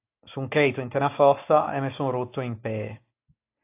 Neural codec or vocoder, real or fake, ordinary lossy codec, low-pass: none; real; AAC, 24 kbps; 3.6 kHz